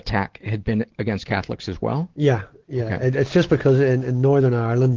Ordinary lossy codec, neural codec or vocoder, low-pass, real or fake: Opus, 16 kbps; none; 7.2 kHz; real